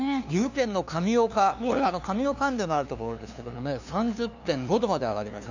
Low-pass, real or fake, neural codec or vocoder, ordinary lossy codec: 7.2 kHz; fake; codec, 16 kHz, 2 kbps, FunCodec, trained on LibriTTS, 25 frames a second; none